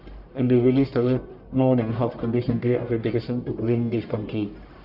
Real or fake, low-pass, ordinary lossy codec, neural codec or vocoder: fake; 5.4 kHz; none; codec, 44.1 kHz, 1.7 kbps, Pupu-Codec